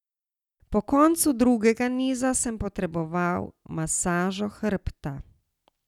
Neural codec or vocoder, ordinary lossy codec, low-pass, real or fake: none; none; 19.8 kHz; real